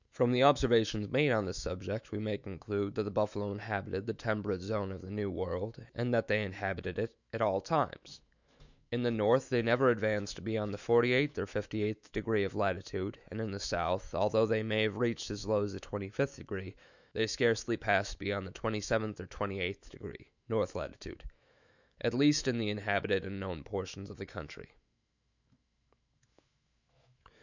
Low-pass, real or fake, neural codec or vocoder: 7.2 kHz; fake; autoencoder, 48 kHz, 128 numbers a frame, DAC-VAE, trained on Japanese speech